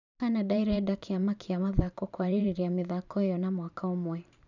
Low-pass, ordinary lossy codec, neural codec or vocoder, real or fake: 7.2 kHz; none; vocoder, 44.1 kHz, 128 mel bands every 512 samples, BigVGAN v2; fake